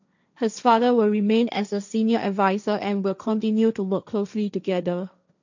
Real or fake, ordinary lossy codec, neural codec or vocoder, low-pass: fake; none; codec, 16 kHz, 1.1 kbps, Voila-Tokenizer; 7.2 kHz